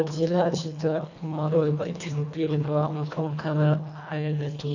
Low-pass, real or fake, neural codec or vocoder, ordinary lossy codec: 7.2 kHz; fake; codec, 24 kHz, 1.5 kbps, HILCodec; none